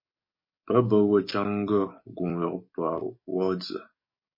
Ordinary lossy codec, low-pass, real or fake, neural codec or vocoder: MP3, 24 kbps; 5.4 kHz; fake; codec, 44.1 kHz, 7.8 kbps, DAC